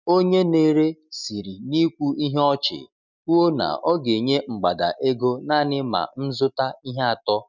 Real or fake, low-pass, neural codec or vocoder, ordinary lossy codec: real; 7.2 kHz; none; none